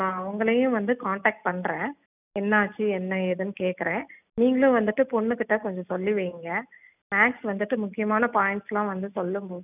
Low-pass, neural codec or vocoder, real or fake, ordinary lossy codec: 3.6 kHz; none; real; none